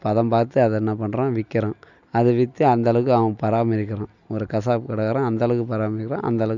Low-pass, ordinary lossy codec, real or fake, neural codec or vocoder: 7.2 kHz; none; real; none